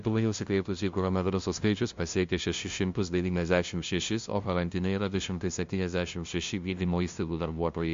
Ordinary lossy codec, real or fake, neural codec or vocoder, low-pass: MP3, 48 kbps; fake; codec, 16 kHz, 0.5 kbps, FunCodec, trained on LibriTTS, 25 frames a second; 7.2 kHz